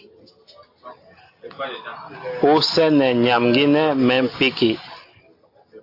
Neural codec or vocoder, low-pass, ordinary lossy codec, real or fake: none; 5.4 kHz; AAC, 32 kbps; real